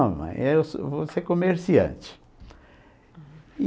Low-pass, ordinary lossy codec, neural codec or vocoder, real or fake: none; none; none; real